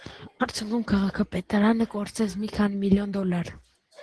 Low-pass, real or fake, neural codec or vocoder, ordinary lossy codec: 10.8 kHz; real; none; Opus, 16 kbps